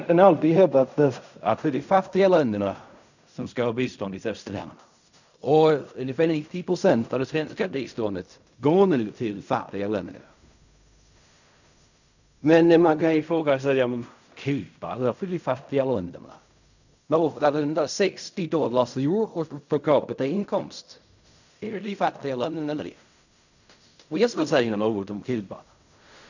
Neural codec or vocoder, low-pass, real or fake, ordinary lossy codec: codec, 16 kHz in and 24 kHz out, 0.4 kbps, LongCat-Audio-Codec, fine tuned four codebook decoder; 7.2 kHz; fake; none